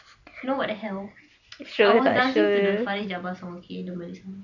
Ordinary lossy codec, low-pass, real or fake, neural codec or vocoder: none; 7.2 kHz; real; none